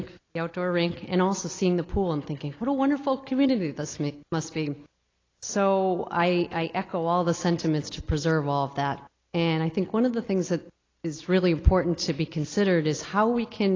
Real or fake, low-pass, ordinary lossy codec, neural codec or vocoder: real; 7.2 kHz; AAC, 32 kbps; none